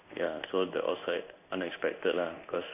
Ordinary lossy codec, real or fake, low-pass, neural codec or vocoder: none; fake; 3.6 kHz; codec, 16 kHz in and 24 kHz out, 1 kbps, XY-Tokenizer